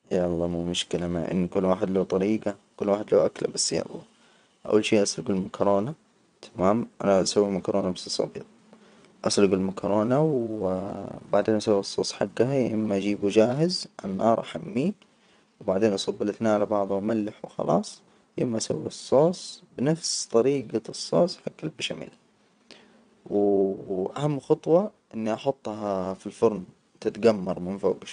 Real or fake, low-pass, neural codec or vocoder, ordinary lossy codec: fake; 9.9 kHz; vocoder, 22.05 kHz, 80 mel bands, WaveNeXt; none